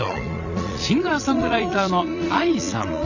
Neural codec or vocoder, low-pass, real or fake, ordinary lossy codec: vocoder, 22.05 kHz, 80 mel bands, Vocos; 7.2 kHz; fake; none